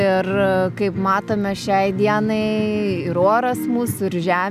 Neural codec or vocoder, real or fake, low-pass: none; real; 14.4 kHz